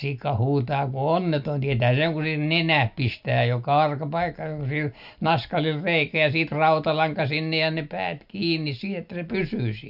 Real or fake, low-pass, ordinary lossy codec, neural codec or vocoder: real; 5.4 kHz; none; none